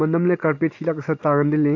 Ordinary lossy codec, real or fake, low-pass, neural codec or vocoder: AAC, 48 kbps; real; 7.2 kHz; none